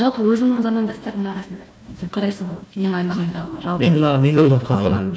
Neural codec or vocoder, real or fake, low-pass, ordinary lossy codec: codec, 16 kHz, 1 kbps, FunCodec, trained on Chinese and English, 50 frames a second; fake; none; none